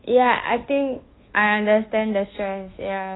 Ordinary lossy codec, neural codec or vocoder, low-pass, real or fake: AAC, 16 kbps; codec, 24 kHz, 1.2 kbps, DualCodec; 7.2 kHz; fake